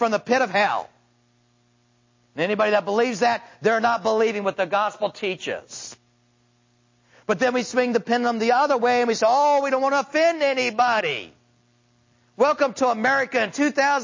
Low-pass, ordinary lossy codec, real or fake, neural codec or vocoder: 7.2 kHz; MP3, 32 kbps; real; none